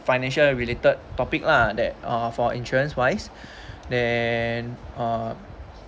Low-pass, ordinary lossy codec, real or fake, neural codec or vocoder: none; none; real; none